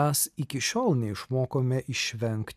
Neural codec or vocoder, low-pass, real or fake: none; 14.4 kHz; real